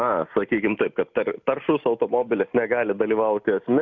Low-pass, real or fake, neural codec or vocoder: 7.2 kHz; real; none